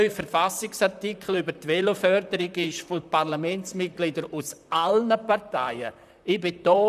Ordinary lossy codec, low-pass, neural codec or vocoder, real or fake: none; 14.4 kHz; vocoder, 44.1 kHz, 128 mel bands, Pupu-Vocoder; fake